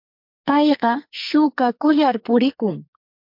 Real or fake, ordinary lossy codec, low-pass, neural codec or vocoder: fake; MP3, 48 kbps; 5.4 kHz; codec, 44.1 kHz, 2.6 kbps, SNAC